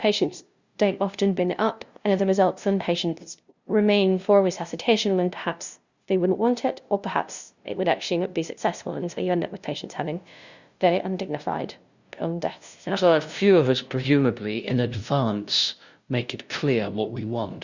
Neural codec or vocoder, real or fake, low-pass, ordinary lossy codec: codec, 16 kHz, 0.5 kbps, FunCodec, trained on LibriTTS, 25 frames a second; fake; 7.2 kHz; Opus, 64 kbps